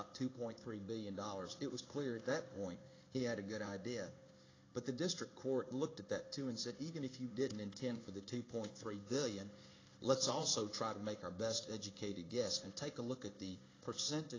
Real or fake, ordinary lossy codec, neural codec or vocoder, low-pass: fake; AAC, 32 kbps; codec, 16 kHz in and 24 kHz out, 1 kbps, XY-Tokenizer; 7.2 kHz